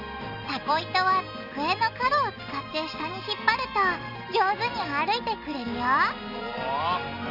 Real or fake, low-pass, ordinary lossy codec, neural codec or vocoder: real; 5.4 kHz; none; none